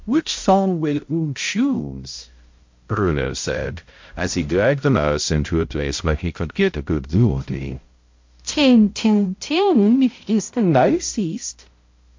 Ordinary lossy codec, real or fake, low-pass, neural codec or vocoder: MP3, 48 kbps; fake; 7.2 kHz; codec, 16 kHz, 0.5 kbps, X-Codec, HuBERT features, trained on balanced general audio